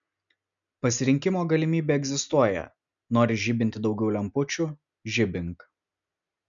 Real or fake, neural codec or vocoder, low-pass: real; none; 7.2 kHz